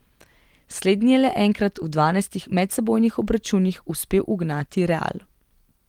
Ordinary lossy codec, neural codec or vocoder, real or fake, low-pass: Opus, 24 kbps; none; real; 19.8 kHz